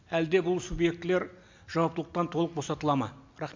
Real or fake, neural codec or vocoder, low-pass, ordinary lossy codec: real; none; 7.2 kHz; MP3, 64 kbps